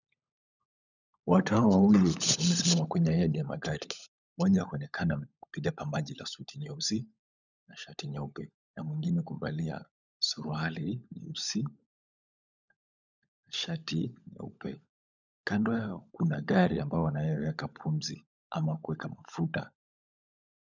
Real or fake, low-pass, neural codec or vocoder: fake; 7.2 kHz; codec, 16 kHz, 16 kbps, FunCodec, trained on LibriTTS, 50 frames a second